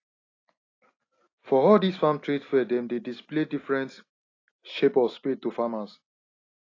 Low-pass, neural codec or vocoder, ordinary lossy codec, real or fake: 7.2 kHz; none; AAC, 32 kbps; real